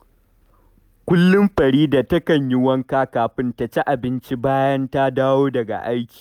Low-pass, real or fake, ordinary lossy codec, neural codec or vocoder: 19.8 kHz; real; none; none